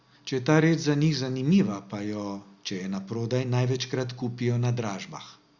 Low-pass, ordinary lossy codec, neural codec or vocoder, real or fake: 7.2 kHz; Opus, 64 kbps; none; real